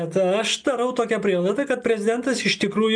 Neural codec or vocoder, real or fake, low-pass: none; real; 9.9 kHz